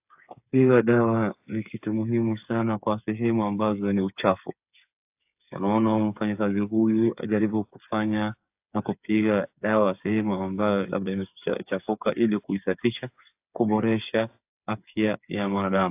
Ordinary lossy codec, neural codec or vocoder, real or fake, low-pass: AAC, 32 kbps; codec, 16 kHz, 8 kbps, FreqCodec, smaller model; fake; 3.6 kHz